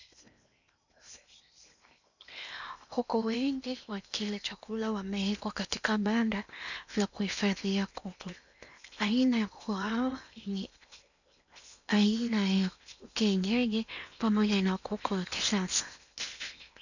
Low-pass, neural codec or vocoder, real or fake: 7.2 kHz; codec, 16 kHz in and 24 kHz out, 0.8 kbps, FocalCodec, streaming, 65536 codes; fake